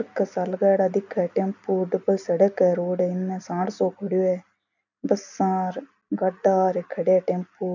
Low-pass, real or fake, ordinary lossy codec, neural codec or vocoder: 7.2 kHz; real; none; none